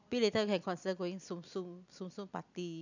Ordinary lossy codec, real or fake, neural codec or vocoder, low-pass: none; real; none; 7.2 kHz